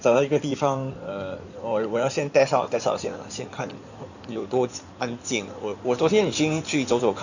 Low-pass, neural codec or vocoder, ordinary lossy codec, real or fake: 7.2 kHz; codec, 16 kHz in and 24 kHz out, 2.2 kbps, FireRedTTS-2 codec; none; fake